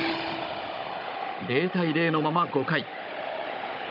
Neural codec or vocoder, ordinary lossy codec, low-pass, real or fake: codec, 16 kHz, 16 kbps, FunCodec, trained on Chinese and English, 50 frames a second; none; 5.4 kHz; fake